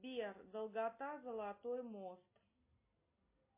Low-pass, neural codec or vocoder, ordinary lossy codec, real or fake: 3.6 kHz; none; MP3, 24 kbps; real